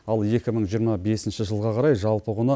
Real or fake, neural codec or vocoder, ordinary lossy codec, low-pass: real; none; none; none